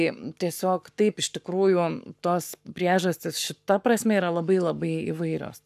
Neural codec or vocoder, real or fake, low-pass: codec, 44.1 kHz, 7.8 kbps, Pupu-Codec; fake; 14.4 kHz